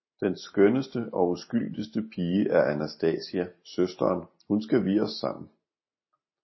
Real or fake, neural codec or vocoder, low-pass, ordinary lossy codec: real; none; 7.2 kHz; MP3, 24 kbps